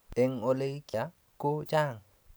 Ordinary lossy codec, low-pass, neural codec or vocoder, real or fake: none; none; none; real